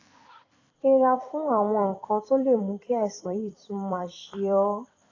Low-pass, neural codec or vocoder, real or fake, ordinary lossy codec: 7.2 kHz; vocoder, 44.1 kHz, 128 mel bands every 256 samples, BigVGAN v2; fake; AAC, 32 kbps